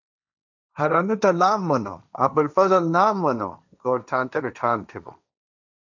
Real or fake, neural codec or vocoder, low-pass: fake; codec, 16 kHz, 1.1 kbps, Voila-Tokenizer; 7.2 kHz